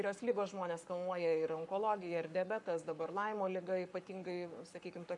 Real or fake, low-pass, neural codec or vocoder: fake; 10.8 kHz; codec, 44.1 kHz, 7.8 kbps, Pupu-Codec